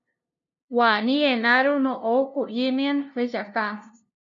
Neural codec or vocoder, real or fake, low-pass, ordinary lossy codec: codec, 16 kHz, 0.5 kbps, FunCodec, trained on LibriTTS, 25 frames a second; fake; 7.2 kHz; MP3, 64 kbps